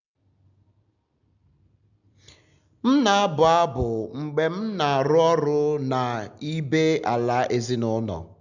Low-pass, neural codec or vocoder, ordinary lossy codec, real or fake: 7.2 kHz; none; none; real